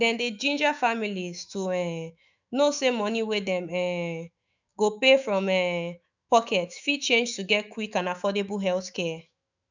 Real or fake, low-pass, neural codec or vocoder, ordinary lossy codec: fake; 7.2 kHz; autoencoder, 48 kHz, 128 numbers a frame, DAC-VAE, trained on Japanese speech; none